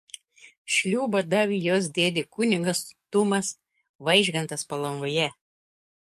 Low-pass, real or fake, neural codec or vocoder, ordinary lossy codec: 14.4 kHz; fake; codec, 44.1 kHz, 7.8 kbps, DAC; MP3, 64 kbps